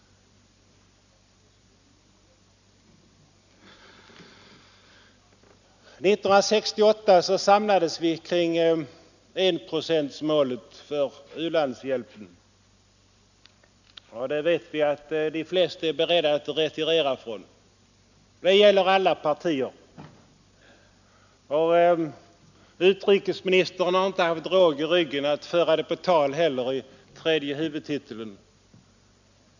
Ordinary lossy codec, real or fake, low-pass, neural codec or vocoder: none; real; 7.2 kHz; none